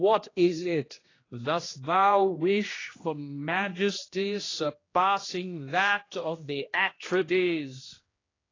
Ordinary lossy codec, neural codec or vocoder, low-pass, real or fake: AAC, 32 kbps; codec, 16 kHz, 1 kbps, X-Codec, HuBERT features, trained on general audio; 7.2 kHz; fake